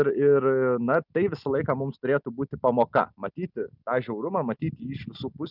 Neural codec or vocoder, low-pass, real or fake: none; 5.4 kHz; real